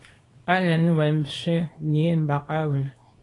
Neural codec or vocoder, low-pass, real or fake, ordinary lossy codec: codec, 24 kHz, 0.9 kbps, WavTokenizer, small release; 10.8 kHz; fake; AAC, 32 kbps